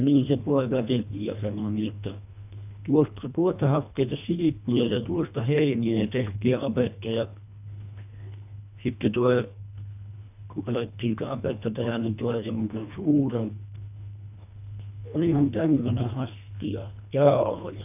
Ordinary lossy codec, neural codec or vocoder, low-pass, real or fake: none; codec, 24 kHz, 1.5 kbps, HILCodec; 3.6 kHz; fake